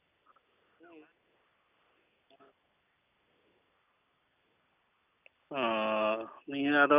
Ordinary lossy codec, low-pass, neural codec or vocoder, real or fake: none; 3.6 kHz; none; real